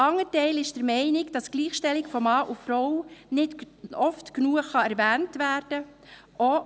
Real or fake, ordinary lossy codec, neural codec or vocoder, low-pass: real; none; none; none